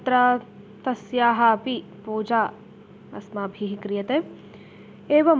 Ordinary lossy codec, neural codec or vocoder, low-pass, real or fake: none; none; none; real